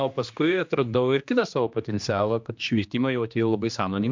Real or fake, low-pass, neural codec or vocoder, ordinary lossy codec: fake; 7.2 kHz; codec, 16 kHz, 2 kbps, X-Codec, HuBERT features, trained on general audio; MP3, 64 kbps